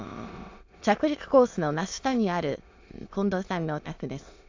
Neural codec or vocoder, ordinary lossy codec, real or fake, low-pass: autoencoder, 22.05 kHz, a latent of 192 numbers a frame, VITS, trained on many speakers; AAC, 48 kbps; fake; 7.2 kHz